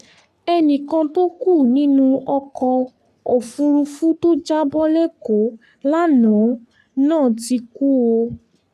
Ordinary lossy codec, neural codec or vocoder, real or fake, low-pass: none; codec, 44.1 kHz, 3.4 kbps, Pupu-Codec; fake; 14.4 kHz